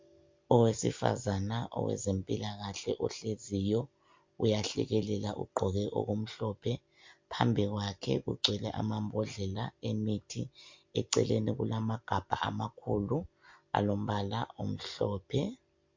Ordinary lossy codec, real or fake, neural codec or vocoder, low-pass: MP3, 48 kbps; real; none; 7.2 kHz